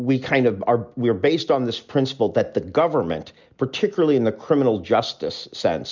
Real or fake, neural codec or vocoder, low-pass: real; none; 7.2 kHz